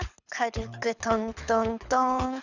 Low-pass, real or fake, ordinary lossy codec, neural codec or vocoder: 7.2 kHz; fake; none; codec, 24 kHz, 6 kbps, HILCodec